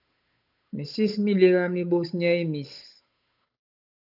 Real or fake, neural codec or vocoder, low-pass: fake; codec, 16 kHz, 8 kbps, FunCodec, trained on Chinese and English, 25 frames a second; 5.4 kHz